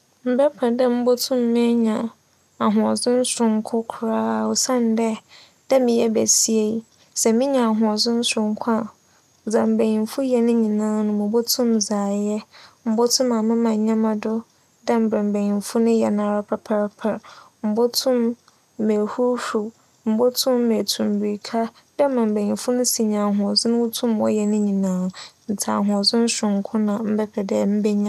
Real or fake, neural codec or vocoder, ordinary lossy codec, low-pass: real; none; none; 14.4 kHz